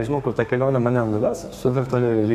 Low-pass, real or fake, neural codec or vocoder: 14.4 kHz; fake; codec, 32 kHz, 1.9 kbps, SNAC